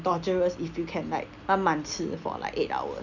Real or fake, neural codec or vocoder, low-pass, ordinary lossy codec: real; none; 7.2 kHz; none